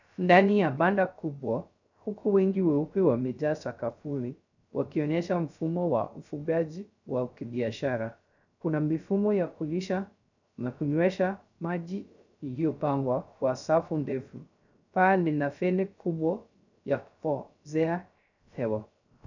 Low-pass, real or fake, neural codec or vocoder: 7.2 kHz; fake; codec, 16 kHz, 0.3 kbps, FocalCodec